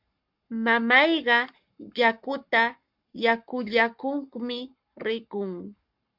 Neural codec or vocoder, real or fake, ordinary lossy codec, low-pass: codec, 44.1 kHz, 7.8 kbps, Pupu-Codec; fake; MP3, 48 kbps; 5.4 kHz